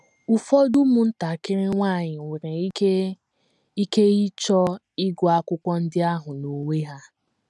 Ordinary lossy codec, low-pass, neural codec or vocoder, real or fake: none; none; none; real